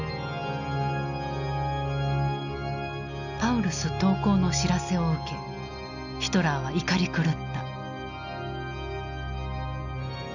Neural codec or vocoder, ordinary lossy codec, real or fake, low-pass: none; none; real; 7.2 kHz